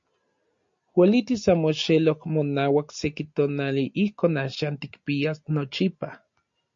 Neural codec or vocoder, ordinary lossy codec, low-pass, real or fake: none; MP3, 48 kbps; 7.2 kHz; real